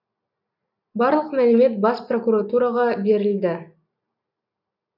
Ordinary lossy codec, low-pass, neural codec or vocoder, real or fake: none; 5.4 kHz; none; real